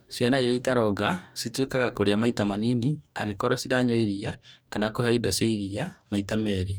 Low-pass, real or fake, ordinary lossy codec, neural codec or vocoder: none; fake; none; codec, 44.1 kHz, 2.6 kbps, DAC